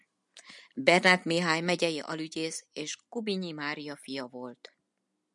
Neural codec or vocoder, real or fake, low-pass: none; real; 10.8 kHz